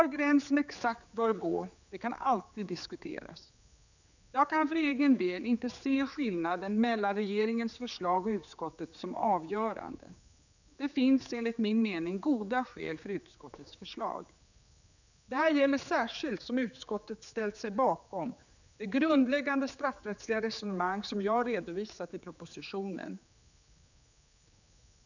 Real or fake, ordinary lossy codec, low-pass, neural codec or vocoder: fake; none; 7.2 kHz; codec, 16 kHz, 4 kbps, X-Codec, HuBERT features, trained on general audio